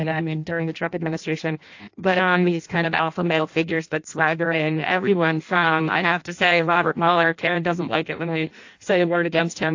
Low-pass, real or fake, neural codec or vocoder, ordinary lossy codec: 7.2 kHz; fake; codec, 16 kHz in and 24 kHz out, 0.6 kbps, FireRedTTS-2 codec; AAC, 48 kbps